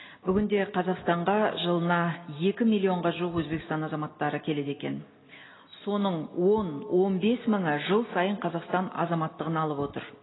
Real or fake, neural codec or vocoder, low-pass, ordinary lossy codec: real; none; 7.2 kHz; AAC, 16 kbps